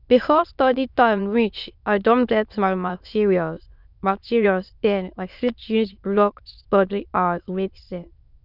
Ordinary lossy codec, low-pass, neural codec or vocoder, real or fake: none; 5.4 kHz; autoencoder, 22.05 kHz, a latent of 192 numbers a frame, VITS, trained on many speakers; fake